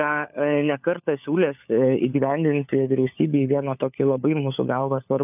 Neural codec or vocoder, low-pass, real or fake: codec, 16 kHz, 4 kbps, FunCodec, trained on LibriTTS, 50 frames a second; 3.6 kHz; fake